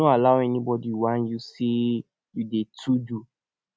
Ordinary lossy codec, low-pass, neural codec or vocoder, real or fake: none; none; none; real